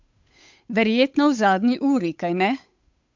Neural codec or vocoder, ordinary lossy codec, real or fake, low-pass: codec, 16 kHz, 8 kbps, FunCodec, trained on Chinese and English, 25 frames a second; MP3, 64 kbps; fake; 7.2 kHz